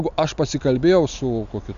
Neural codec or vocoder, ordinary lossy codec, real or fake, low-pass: none; AAC, 96 kbps; real; 7.2 kHz